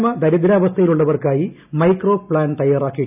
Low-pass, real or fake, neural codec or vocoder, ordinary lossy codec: 3.6 kHz; real; none; none